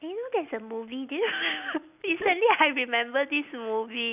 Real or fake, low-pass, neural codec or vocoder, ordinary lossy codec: real; 3.6 kHz; none; none